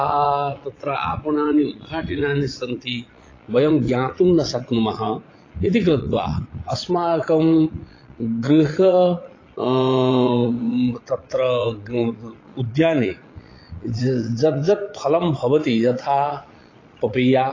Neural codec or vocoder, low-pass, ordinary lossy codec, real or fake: vocoder, 22.05 kHz, 80 mel bands, Vocos; 7.2 kHz; AAC, 32 kbps; fake